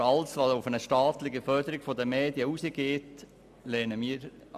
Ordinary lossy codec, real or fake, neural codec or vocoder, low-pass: MP3, 96 kbps; real; none; 14.4 kHz